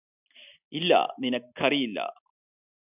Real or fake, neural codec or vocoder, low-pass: real; none; 3.6 kHz